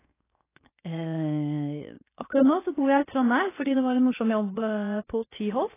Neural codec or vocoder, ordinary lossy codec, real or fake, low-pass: codec, 16 kHz, 0.7 kbps, FocalCodec; AAC, 16 kbps; fake; 3.6 kHz